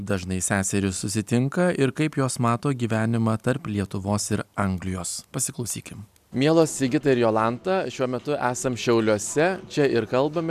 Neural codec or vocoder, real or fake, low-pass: none; real; 14.4 kHz